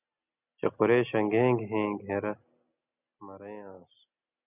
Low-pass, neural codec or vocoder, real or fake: 3.6 kHz; none; real